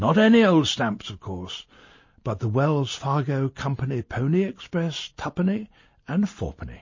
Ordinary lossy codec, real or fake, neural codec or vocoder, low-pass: MP3, 32 kbps; real; none; 7.2 kHz